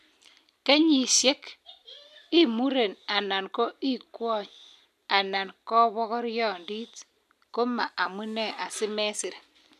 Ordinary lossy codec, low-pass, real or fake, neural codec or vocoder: none; 14.4 kHz; real; none